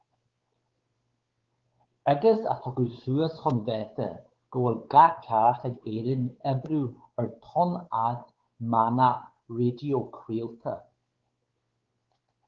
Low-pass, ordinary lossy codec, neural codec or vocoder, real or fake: 7.2 kHz; Opus, 16 kbps; codec, 16 kHz, 4 kbps, X-Codec, WavLM features, trained on Multilingual LibriSpeech; fake